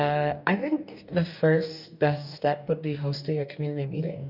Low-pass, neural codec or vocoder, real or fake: 5.4 kHz; codec, 44.1 kHz, 2.6 kbps, DAC; fake